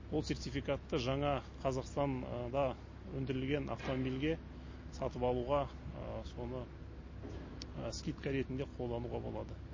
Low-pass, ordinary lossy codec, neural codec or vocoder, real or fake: 7.2 kHz; MP3, 32 kbps; none; real